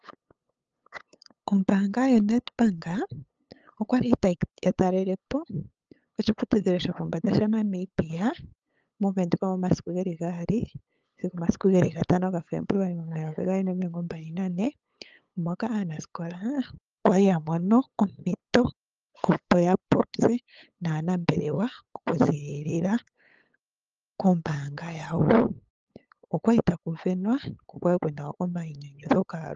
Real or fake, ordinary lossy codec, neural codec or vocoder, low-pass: fake; Opus, 24 kbps; codec, 16 kHz, 8 kbps, FunCodec, trained on LibriTTS, 25 frames a second; 7.2 kHz